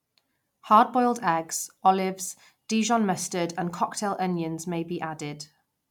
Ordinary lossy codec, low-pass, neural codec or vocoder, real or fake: none; 19.8 kHz; none; real